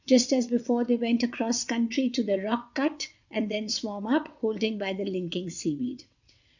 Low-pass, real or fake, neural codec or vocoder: 7.2 kHz; fake; vocoder, 22.05 kHz, 80 mel bands, WaveNeXt